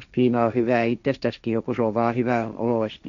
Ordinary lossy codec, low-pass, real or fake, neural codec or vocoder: none; 7.2 kHz; fake; codec, 16 kHz, 1.1 kbps, Voila-Tokenizer